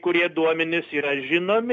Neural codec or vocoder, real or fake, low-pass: none; real; 7.2 kHz